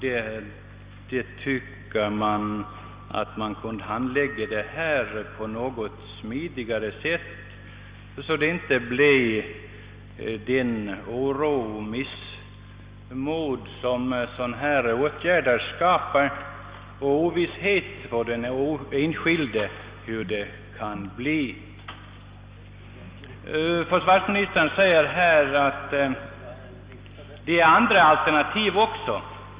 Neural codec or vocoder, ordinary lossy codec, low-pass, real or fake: none; Opus, 64 kbps; 3.6 kHz; real